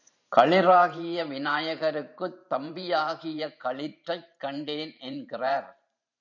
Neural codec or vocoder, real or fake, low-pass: vocoder, 44.1 kHz, 128 mel bands every 512 samples, BigVGAN v2; fake; 7.2 kHz